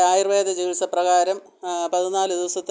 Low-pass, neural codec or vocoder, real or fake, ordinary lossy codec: none; none; real; none